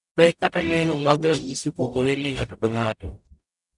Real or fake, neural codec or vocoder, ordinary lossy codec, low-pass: fake; codec, 44.1 kHz, 0.9 kbps, DAC; none; 10.8 kHz